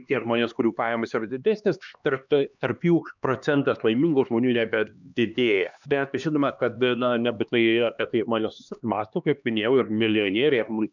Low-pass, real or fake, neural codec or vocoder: 7.2 kHz; fake; codec, 16 kHz, 2 kbps, X-Codec, HuBERT features, trained on LibriSpeech